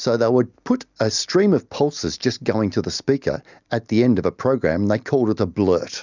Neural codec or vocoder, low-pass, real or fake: none; 7.2 kHz; real